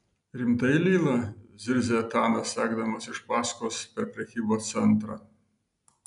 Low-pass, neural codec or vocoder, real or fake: 10.8 kHz; none; real